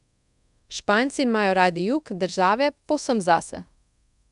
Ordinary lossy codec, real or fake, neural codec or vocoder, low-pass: none; fake; codec, 24 kHz, 0.5 kbps, DualCodec; 10.8 kHz